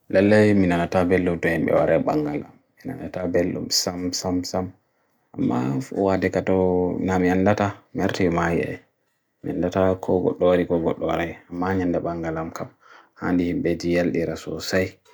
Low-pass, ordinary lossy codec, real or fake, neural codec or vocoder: none; none; real; none